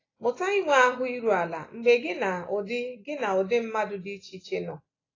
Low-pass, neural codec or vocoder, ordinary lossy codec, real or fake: 7.2 kHz; none; AAC, 32 kbps; real